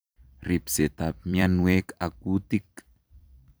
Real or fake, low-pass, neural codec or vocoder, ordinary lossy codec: fake; none; vocoder, 44.1 kHz, 128 mel bands every 256 samples, BigVGAN v2; none